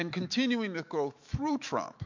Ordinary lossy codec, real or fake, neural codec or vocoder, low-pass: MP3, 48 kbps; fake; autoencoder, 48 kHz, 128 numbers a frame, DAC-VAE, trained on Japanese speech; 7.2 kHz